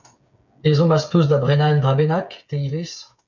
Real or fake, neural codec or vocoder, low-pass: fake; codec, 16 kHz, 8 kbps, FreqCodec, smaller model; 7.2 kHz